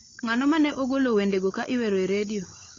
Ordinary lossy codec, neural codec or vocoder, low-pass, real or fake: AAC, 32 kbps; codec, 16 kHz, 16 kbps, FunCodec, trained on LibriTTS, 50 frames a second; 7.2 kHz; fake